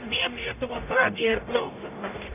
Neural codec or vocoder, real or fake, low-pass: codec, 44.1 kHz, 0.9 kbps, DAC; fake; 3.6 kHz